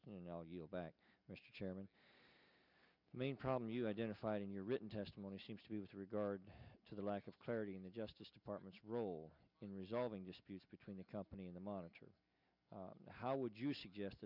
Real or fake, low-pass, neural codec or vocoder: real; 5.4 kHz; none